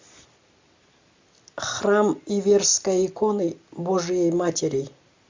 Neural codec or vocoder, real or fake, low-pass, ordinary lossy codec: none; real; 7.2 kHz; MP3, 64 kbps